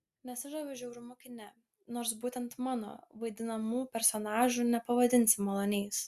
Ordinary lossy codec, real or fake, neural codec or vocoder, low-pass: Opus, 64 kbps; real; none; 14.4 kHz